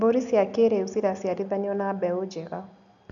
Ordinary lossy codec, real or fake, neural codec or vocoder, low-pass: none; real; none; 7.2 kHz